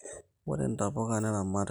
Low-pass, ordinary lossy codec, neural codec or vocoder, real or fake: none; none; none; real